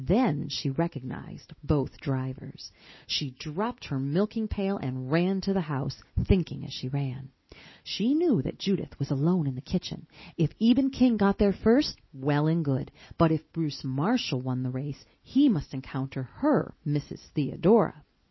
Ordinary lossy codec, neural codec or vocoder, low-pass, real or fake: MP3, 24 kbps; none; 7.2 kHz; real